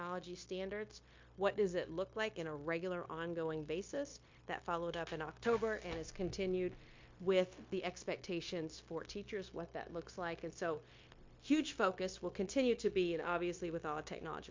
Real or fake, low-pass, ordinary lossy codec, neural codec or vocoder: fake; 7.2 kHz; MP3, 48 kbps; codec, 16 kHz, 0.9 kbps, LongCat-Audio-Codec